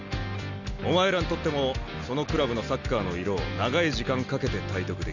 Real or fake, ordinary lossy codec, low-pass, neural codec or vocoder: real; none; 7.2 kHz; none